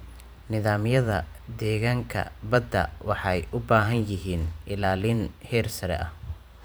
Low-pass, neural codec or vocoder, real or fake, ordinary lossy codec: none; vocoder, 44.1 kHz, 128 mel bands every 512 samples, BigVGAN v2; fake; none